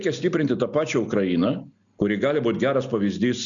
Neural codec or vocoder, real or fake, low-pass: none; real; 7.2 kHz